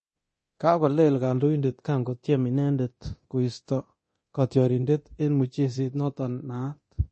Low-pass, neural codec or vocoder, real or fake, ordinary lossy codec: 10.8 kHz; codec, 24 kHz, 0.9 kbps, DualCodec; fake; MP3, 32 kbps